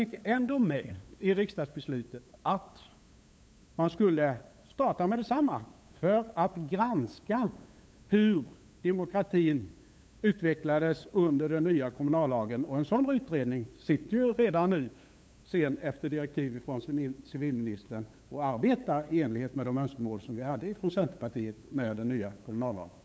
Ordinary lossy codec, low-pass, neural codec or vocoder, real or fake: none; none; codec, 16 kHz, 8 kbps, FunCodec, trained on LibriTTS, 25 frames a second; fake